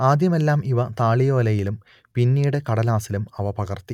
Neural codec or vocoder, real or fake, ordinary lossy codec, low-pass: none; real; none; 19.8 kHz